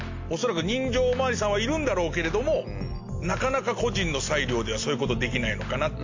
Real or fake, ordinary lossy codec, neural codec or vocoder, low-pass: real; AAC, 48 kbps; none; 7.2 kHz